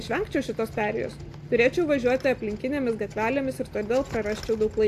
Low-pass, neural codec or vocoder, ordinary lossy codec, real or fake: 14.4 kHz; none; AAC, 64 kbps; real